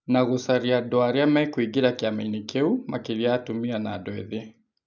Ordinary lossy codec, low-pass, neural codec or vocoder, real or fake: none; 7.2 kHz; none; real